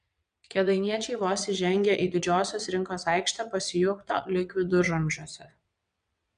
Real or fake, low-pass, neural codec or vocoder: fake; 9.9 kHz; vocoder, 22.05 kHz, 80 mel bands, WaveNeXt